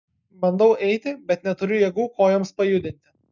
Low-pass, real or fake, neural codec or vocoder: 7.2 kHz; real; none